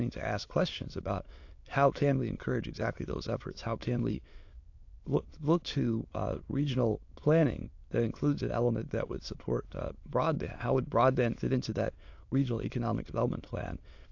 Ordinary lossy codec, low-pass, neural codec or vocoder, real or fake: AAC, 48 kbps; 7.2 kHz; autoencoder, 22.05 kHz, a latent of 192 numbers a frame, VITS, trained on many speakers; fake